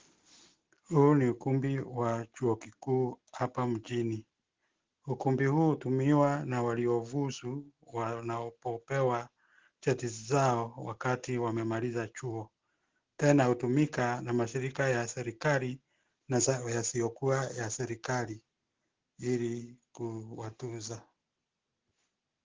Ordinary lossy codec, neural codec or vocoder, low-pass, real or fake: Opus, 16 kbps; none; 7.2 kHz; real